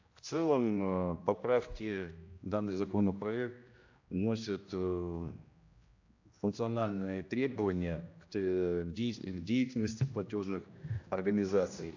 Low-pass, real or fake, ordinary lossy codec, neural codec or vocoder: 7.2 kHz; fake; AAC, 48 kbps; codec, 16 kHz, 1 kbps, X-Codec, HuBERT features, trained on general audio